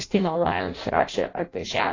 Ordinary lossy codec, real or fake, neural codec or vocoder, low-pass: AAC, 32 kbps; fake; codec, 16 kHz in and 24 kHz out, 0.6 kbps, FireRedTTS-2 codec; 7.2 kHz